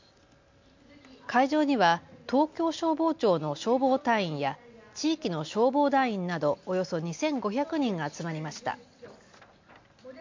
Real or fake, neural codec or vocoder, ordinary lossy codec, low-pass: real; none; MP3, 48 kbps; 7.2 kHz